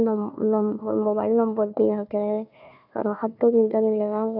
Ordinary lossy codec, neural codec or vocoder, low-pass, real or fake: none; codec, 16 kHz, 1 kbps, FunCodec, trained on Chinese and English, 50 frames a second; 5.4 kHz; fake